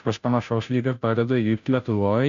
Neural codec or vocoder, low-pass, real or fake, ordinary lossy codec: codec, 16 kHz, 0.5 kbps, FunCodec, trained on Chinese and English, 25 frames a second; 7.2 kHz; fake; AAC, 96 kbps